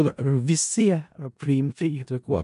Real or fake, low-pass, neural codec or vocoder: fake; 10.8 kHz; codec, 16 kHz in and 24 kHz out, 0.4 kbps, LongCat-Audio-Codec, four codebook decoder